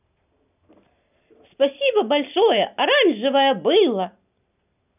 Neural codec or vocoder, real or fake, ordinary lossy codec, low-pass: none; real; none; 3.6 kHz